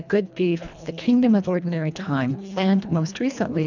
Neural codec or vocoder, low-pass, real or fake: codec, 24 kHz, 1.5 kbps, HILCodec; 7.2 kHz; fake